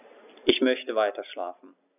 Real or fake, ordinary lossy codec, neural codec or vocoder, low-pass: real; none; none; 3.6 kHz